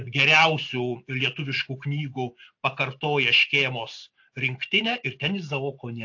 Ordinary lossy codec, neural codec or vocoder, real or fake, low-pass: AAC, 48 kbps; none; real; 7.2 kHz